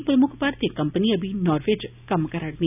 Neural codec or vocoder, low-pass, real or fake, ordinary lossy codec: none; 3.6 kHz; real; none